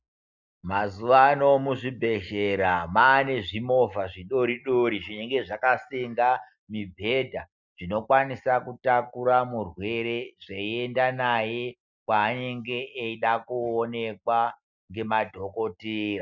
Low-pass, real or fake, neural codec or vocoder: 7.2 kHz; real; none